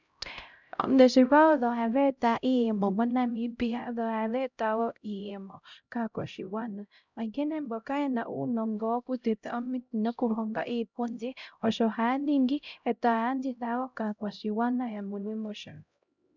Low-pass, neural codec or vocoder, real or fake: 7.2 kHz; codec, 16 kHz, 0.5 kbps, X-Codec, HuBERT features, trained on LibriSpeech; fake